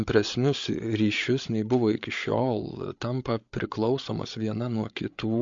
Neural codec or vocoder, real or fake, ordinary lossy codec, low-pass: codec, 16 kHz, 8 kbps, FreqCodec, larger model; fake; MP3, 48 kbps; 7.2 kHz